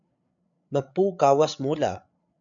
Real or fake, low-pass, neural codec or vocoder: fake; 7.2 kHz; codec, 16 kHz, 8 kbps, FreqCodec, larger model